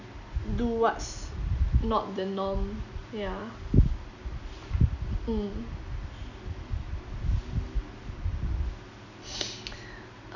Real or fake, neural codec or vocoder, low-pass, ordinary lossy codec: real; none; 7.2 kHz; none